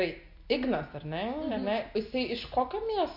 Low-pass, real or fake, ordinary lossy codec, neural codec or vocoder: 5.4 kHz; real; MP3, 48 kbps; none